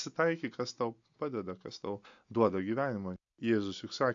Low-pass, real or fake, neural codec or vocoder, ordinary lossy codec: 7.2 kHz; real; none; AAC, 64 kbps